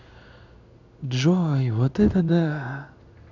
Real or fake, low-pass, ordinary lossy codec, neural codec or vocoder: fake; 7.2 kHz; none; codec, 16 kHz in and 24 kHz out, 1 kbps, XY-Tokenizer